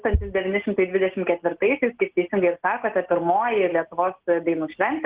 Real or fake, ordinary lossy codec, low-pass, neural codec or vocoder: real; Opus, 16 kbps; 3.6 kHz; none